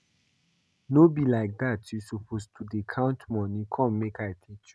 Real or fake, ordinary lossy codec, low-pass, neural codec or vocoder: real; none; none; none